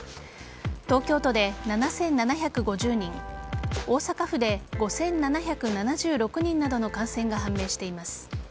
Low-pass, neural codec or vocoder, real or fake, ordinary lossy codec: none; none; real; none